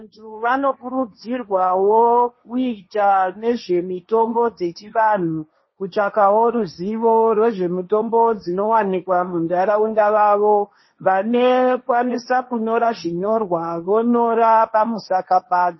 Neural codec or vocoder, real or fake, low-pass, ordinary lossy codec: codec, 16 kHz, 1.1 kbps, Voila-Tokenizer; fake; 7.2 kHz; MP3, 24 kbps